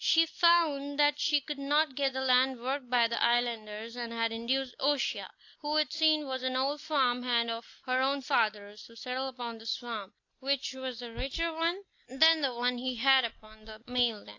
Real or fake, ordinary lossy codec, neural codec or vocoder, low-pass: real; AAC, 48 kbps; none; 7.2 kHz